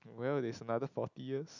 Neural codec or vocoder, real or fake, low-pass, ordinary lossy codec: none; real; 7.2 kHz; none